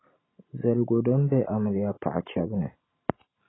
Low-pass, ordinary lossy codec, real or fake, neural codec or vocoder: 7.2 kHz; AAC, 16 kbps; real; none